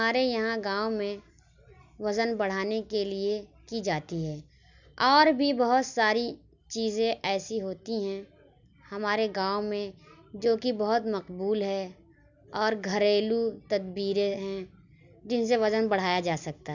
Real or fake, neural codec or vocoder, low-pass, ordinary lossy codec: real; none; 7.2 kHz; none